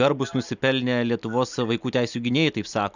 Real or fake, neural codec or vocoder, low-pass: real; none; 7.2 kHz